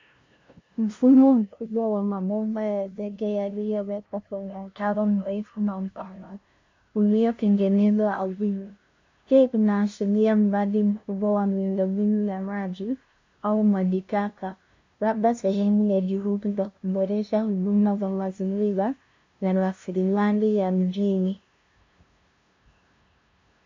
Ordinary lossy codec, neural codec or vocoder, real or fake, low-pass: MP3, 64 kbps; codec, 16 kHz, 0.5 kbps, FunCodec, trained on LibriTTS, 25 frames a second; fake; 7.2 kHz